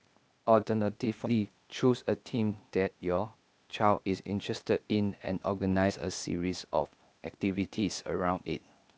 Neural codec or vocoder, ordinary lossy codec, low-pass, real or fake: codec, 16 kHz, 0.8 kbps, ZipCodec; none; none; fake